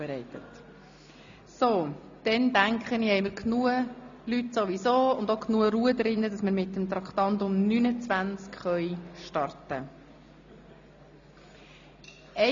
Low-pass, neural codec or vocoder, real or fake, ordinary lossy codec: 7.2 kHz; none; real; AAC, 64 kbps